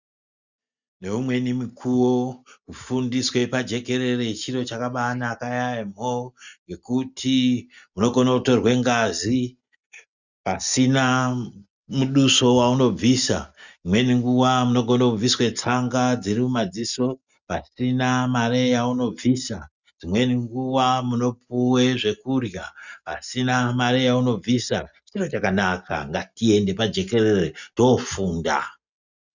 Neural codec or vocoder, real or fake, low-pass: none; real; 7.2 kHz